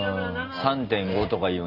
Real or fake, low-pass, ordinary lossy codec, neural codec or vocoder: real; 5.4 kHz; Opus, 32 kbps; none